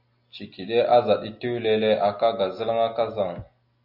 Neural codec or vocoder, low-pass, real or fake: none; 5.4 kHz; real